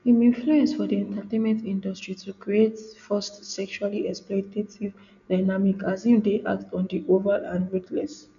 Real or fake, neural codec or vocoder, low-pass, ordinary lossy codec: real; none; 7.2 kHz; none